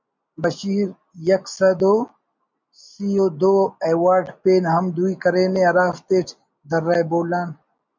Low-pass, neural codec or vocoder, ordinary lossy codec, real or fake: 7.2 kHz; none; MP3, 64 kbps; real